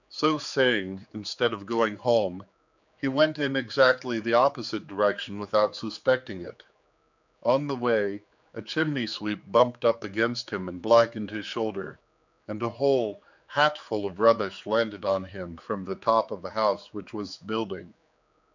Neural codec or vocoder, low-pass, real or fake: codec, 16 kHz, 4 kbps, X-Codec, HuBERT features, trained on general audio; 7.2 kHz; fake